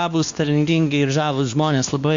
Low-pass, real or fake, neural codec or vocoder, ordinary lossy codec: 7.2 kHz; fake; codec, 16 kHz, 2 kbps, X-Codec, WavLM features, trained on Multilingual LibriSpeech; Opus, 64 kbps